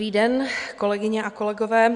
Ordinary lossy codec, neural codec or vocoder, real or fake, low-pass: MP3, 96 kbps; none; real; 9.9 kHz